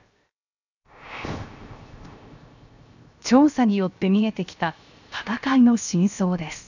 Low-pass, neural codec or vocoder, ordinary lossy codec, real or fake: 7.2 kHz; codec, 16 kHz, 0.7 kbps, FocalCodec; none; fake